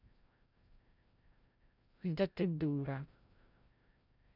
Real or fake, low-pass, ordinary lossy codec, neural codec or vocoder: fake; 5.4 kHz; none; codec, 16 kHz, 0.5 kbps, FreqCodec, larger model